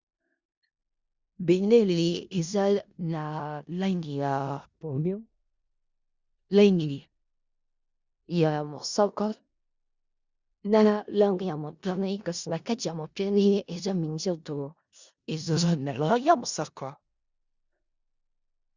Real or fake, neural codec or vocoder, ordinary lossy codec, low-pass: fake; codec, 16 kHz in and 24 kHz out, 0.4 kbps, LongCat-Audio-Codec, four codebook decoder; Opus, 64 kbps; 7.2 kHz